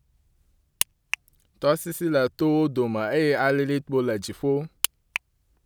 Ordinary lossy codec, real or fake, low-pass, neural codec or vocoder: none; real; none; none